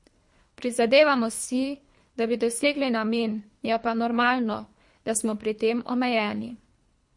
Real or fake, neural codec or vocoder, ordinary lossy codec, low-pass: fake; codec, 24 kHz, 3 kbps, HILCodec; MP3, 48 kbps; 10.8 kHz